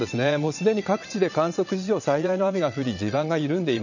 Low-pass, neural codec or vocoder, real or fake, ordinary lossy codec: 7.2 kHz; vocoder, 22.05 kHz, 80 mel bands, Vocos; fake; none